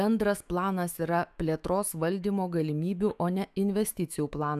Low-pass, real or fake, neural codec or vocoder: 14.4 kHz; fake; autoencoder, 48 kHz, 128 numbers a frame, DAC-VAE, trained on Japanese speech